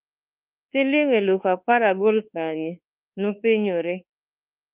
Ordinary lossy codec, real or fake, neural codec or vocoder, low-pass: Opus, 24 kbps; fake; codec, 24 kHz, 1.2 kbps, DualCodec; 3.6 kHz